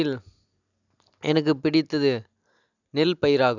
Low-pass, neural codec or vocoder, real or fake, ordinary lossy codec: 7.2 kHz; none; real; none